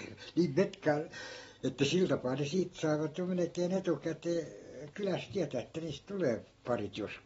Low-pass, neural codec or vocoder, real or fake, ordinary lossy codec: 19.8 kHz; none; real; AAC, 24 kbps